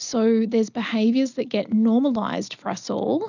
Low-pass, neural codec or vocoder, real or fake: 7.2 kHz; none; real